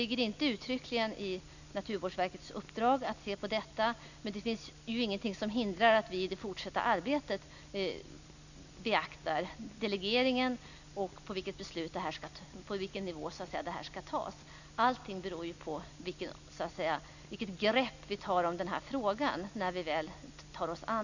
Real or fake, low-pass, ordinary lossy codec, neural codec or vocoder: real; 7.2 kHz; none; none